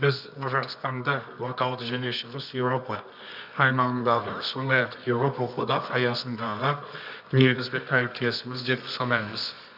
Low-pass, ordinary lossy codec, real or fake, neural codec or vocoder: 5.4 kHz; none; fake; codec, 24 kHz, 0.9 kbps, WavTokenizer, medium music audio release